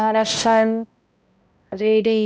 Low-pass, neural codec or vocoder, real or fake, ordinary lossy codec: none; codec, 16 kHz, 0.5 kbps, X-Codec, HuBERT features, trained on balanced general audio; fake; none